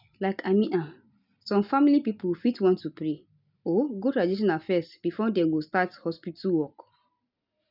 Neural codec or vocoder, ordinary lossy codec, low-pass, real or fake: none; none; 5.4 kHz; real